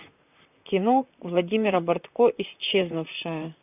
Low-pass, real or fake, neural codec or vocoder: 3.6 kHz; fake; vocoder, 44.1 kHz, 128 mel bands, Pupu-Vocoder